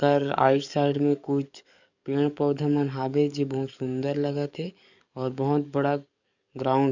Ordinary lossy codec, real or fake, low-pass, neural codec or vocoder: none; fake; 7.2 kHz; codec, 44.1 kHz, 7.8 kbps, DAC